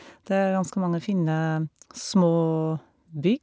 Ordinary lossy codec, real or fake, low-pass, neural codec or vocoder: none; real; none; none